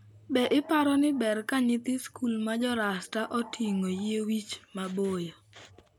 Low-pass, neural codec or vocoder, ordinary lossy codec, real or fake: 19.8 kHz; none; none; real